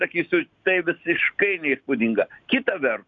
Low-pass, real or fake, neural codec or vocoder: 7.2 kHz; real; none